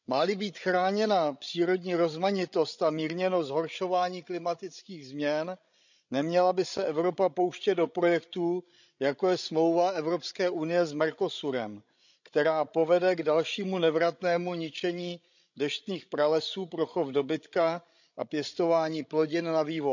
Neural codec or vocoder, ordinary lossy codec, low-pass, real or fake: codec, 16 kHz, 16 kbps, FreqCodec, larger model; none; 7.2 kHz; fake